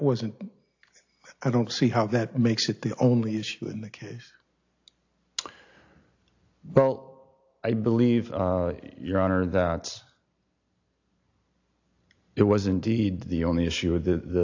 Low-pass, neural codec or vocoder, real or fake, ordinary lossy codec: 7.2 kHz; none; real; AAC, 48 kbps